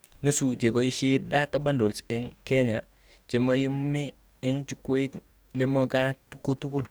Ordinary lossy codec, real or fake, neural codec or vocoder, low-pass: none; fake; codec, 44.1 kHz, 2.6 kbps, DAC; none